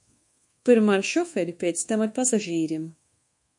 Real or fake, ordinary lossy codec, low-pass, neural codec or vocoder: fake; MP3, 48 kbps; 10.8 kHz; codec, 24 kHz, 1.2 kbps, DualCodec